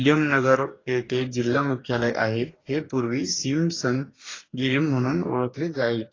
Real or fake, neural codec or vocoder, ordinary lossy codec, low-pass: fake; codec, 44.1 kHz, 2.6 kbps, DAC; AAC, 32 kbps; 7.2 kHz